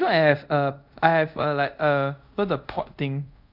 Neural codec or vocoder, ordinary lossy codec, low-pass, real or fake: codec, 24 kHz, 0.5 kbps, DualCodec; none; 5.4 kHz; fake